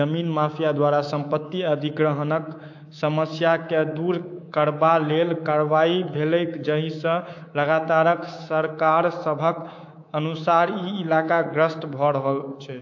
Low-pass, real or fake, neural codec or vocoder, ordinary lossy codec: 7.2 kHz; fake; codec, 24 kHz, 3.1 kbps, DualCodec; AAC, 48 kbps